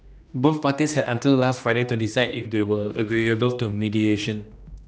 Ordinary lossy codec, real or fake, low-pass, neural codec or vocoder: none; fake; none; codec, 16 kHz, 1 kbps, X-Codec, HuBERT features, trained on general audio